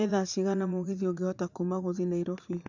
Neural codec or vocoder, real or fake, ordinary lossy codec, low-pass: vocoder, 44.1 kHz, 80 mel bands, Vocos; fake; none; 7.2 kHz